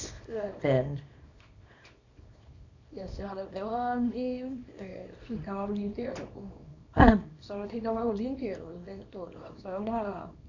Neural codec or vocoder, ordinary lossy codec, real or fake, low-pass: codec, 24 kHz, 0.9 kbps, WavTokenizer, small release; none; fake; 7.2 kHz